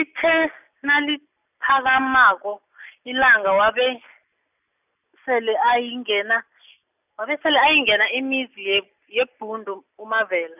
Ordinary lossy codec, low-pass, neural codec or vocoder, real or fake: none; 3.6 kHz; none; real